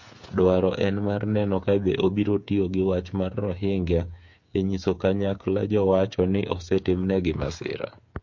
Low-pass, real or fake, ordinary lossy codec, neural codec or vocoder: 7.2 kHz; fake; MP3, 48 kbps; codec, 16 kHz, 8 kbps, FreqCodec, smaller model